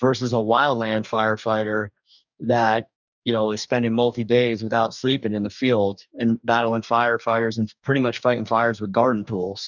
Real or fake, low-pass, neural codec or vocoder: fake; 7.2 kHz; codec, 44.1 kHz, 2.6 kbps, DAC